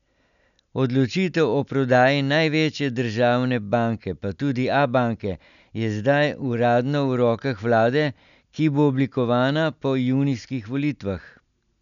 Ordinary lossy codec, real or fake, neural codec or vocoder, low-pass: none; real; none; 7.2 kHz